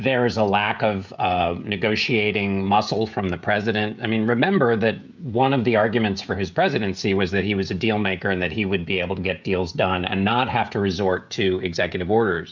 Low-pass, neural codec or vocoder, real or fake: 7.2 kHz; codec, 16 kHz, 16 kbps, FreqCodec, smaller model; fake